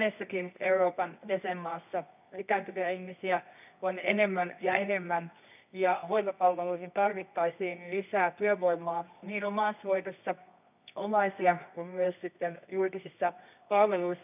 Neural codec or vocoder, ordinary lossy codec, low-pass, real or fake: codec, 24 kHz, 0.9 kbps, WavTokenizer, medium music audio release; none; 3.6 kHz; fake